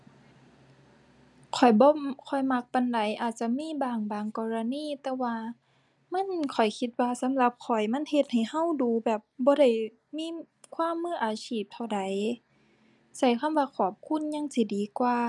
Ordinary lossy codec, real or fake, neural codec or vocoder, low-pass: none; real; none; none